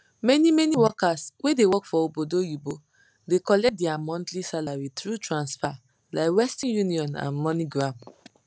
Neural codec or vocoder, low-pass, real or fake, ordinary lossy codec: none; none; real; none